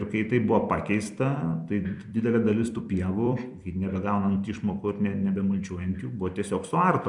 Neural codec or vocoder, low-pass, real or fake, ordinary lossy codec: vocoder, 44.1 kHz, 128 mel bands every 256 samples, BigVGAN v2; 10.8 kHz; fake; MP3, 96 kbps